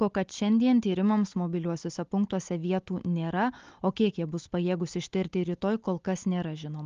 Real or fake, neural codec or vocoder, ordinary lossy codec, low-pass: real; none; Opus, 32 kbps; 7.2 kHz